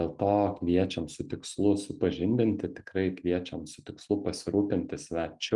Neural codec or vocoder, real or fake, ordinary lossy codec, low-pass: none; real; Opus, 32 kbps; 10.8 kHz